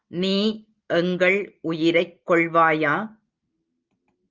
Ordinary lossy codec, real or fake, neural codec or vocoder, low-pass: Opus, 24 kbps; real; none; 7.2 kHz